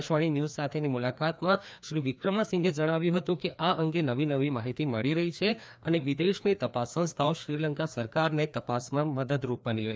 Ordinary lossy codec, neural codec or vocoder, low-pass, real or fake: none; codec, 16 kHz, 2 kbps, FreqCodec, larger model; none; fake